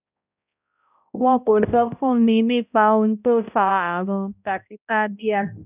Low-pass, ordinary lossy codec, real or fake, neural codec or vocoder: 3.6 kHz; none; fake; codec, 16 kHz, 0.5 kbps, X-Codec, HuBERT features, trained on balanced general audio